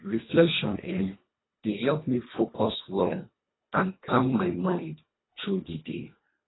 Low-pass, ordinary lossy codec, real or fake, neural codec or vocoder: 7.2 kHz; AAC, 16 kbps; fake; codec, 24 kHz, 1.5 kbps, HILCodec